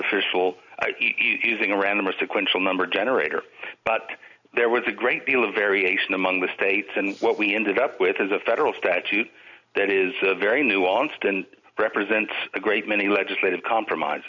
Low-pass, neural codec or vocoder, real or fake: 7.2 kHz; none; real